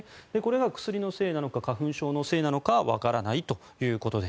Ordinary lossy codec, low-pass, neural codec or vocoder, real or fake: none; none; none; real